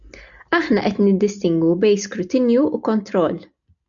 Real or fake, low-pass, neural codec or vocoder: real; 7.2 kHz; none